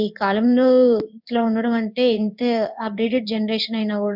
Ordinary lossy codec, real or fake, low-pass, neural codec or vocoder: none; fake; 5.4 kHz; codec, 16 kHz in and 24 kHz out, 1 kbps, XY-Tokenizer